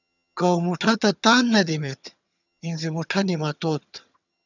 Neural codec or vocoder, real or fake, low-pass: vocoder, 22.05 kHz, 80 mel bands, HiFi-GAN; fake; 7.2 kHz